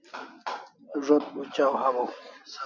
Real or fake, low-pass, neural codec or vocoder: real; 7.2 kHz; none